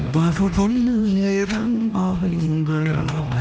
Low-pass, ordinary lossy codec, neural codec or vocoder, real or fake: none; none; codec, 16 kHz, 1 kbps, X-Codec, HuBERT features, trained on LibriSpeech; fake